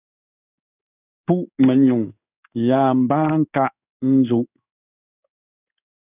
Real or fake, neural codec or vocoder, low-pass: fake; codec, 16 kHz in and 24 kHz out, 1 kbps, XY-Tokenizer; 3.6 kHz